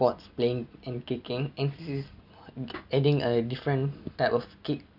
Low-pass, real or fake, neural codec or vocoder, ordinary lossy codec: 5.4 kHz; real; none; none